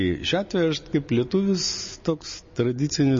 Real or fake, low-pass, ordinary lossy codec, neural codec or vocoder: real; 7.2 kHz; MP3, 32 kbps; none